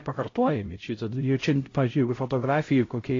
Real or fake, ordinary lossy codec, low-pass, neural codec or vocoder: fake; AAC, 32 kbps; 7.2 kHz; codec, 16 kHz, 0.5 kbps, X-Codec, HuBERT features, trained on LibriSpeech